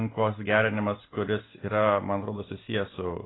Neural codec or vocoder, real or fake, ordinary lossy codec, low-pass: none; real; AAC, 16 kbps; 7.2 kHz